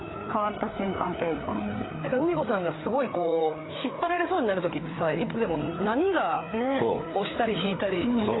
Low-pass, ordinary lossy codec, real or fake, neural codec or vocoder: 7.2 kHz; AAC, 16 kbps; fake; codec, 16 kHz, 4 kbps, FreqCodec, larger model